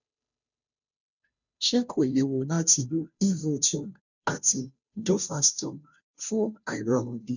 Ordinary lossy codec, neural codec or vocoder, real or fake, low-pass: none; codec, 16 kHz, 0.5 kbps, FunCodec, trained on Chinese and English, 25 frames a second; fake; 7.2 kHz